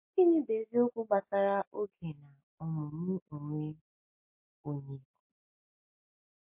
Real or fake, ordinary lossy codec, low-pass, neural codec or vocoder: real; MP3, 32 kbps; 3.6 kHz; none